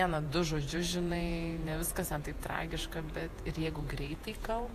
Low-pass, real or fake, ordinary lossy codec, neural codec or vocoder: 14.4 kHz; fake; AAC, 64 kbps; vocoder, 48 kHz, 128 mel bands, Vocos